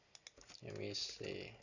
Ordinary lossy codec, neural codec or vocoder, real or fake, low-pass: none; none; real; 7.2 kHz